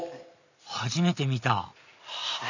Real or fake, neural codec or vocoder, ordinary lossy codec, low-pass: real; none; none; 7.2 kHz